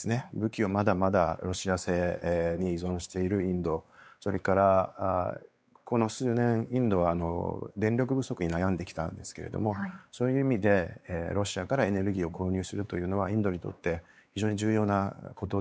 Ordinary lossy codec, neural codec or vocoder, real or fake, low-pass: none; codec, 16 kHz, 4 kbps, X-Codec, WavLM features, trained on Multilingual LibriSpeech; fake; none